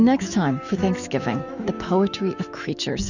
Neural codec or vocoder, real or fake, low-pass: autoencoder, 48 kHz, 128 numbers a frame, DAC-VAE, trained on Japanese speech; fake; 7.2 kHz